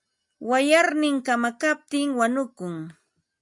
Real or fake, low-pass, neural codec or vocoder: real; 10.8 kHz; none